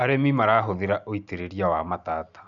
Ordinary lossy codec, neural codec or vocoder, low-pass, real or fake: none; none; 7.2 kHz; real